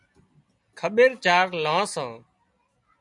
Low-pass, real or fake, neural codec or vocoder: 10.8 kHz; real; none